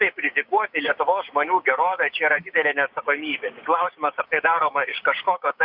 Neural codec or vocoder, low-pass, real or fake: codec, 16 kHz, 6 kbps, DAC; 5.4 kHz; fake